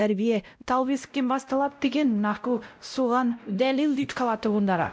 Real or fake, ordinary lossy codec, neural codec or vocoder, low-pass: fake; none; codec, 16 kHz, 0.5 kbps, X-Codec, WavLM features, trained on Multilingual LibriSpeech; none